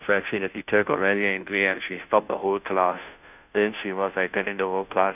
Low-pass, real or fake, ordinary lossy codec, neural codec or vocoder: 3.6 kHz; fake; none; codec, 16 kHz, 0.5 kbps, FunCodec, trained on Chinese and English, 25 frames a second